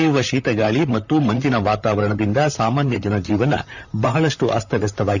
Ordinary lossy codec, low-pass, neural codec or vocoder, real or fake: none; 7.2 kHz; vocoder, 44.1 kHz, 128 mel bands, Pupu-Vocoder; fake